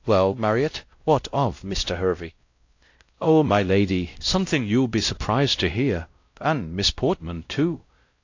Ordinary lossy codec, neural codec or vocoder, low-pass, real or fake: AAC, 48 kbps; codec, 16 kHz, 0.5 kbps, X-Codec, WavLM features, trained on Multilingual LibriSpeech; 7.2 kHz; fake